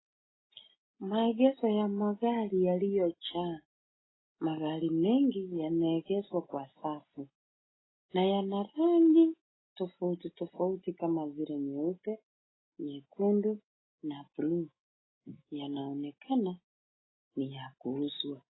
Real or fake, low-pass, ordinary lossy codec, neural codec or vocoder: real; 7.2 kHz; AAC, 16 kbps; none